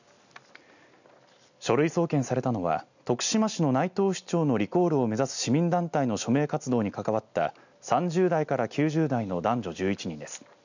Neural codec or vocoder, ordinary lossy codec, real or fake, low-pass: none; none; real; 7.2 kHz